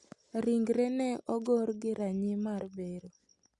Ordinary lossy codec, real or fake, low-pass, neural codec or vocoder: Opus, 64 kbps; real; 10.8 kHz; none